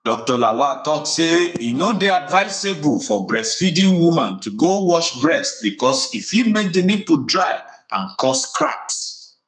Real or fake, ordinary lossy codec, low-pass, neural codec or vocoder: fake; none; 10.8 kHz; codec, 32 kHz, 1.9 kbps, SNAC